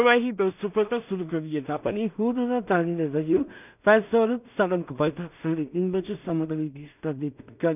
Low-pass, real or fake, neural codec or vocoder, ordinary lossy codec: 3.6 kHz; fake; codec, 16 kHz in and 24 kHz out, 0.4 kbps, LongCat-Audio-Codec, two codebook decoder; none